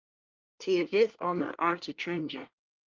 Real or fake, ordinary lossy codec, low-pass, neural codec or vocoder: fake; Opus, 32 kbps; 7.2 kHz; codec, 24 kHz, 1 kbps, SNAC